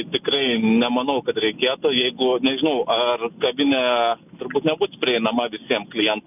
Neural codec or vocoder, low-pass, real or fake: none; 3.6 kHz; real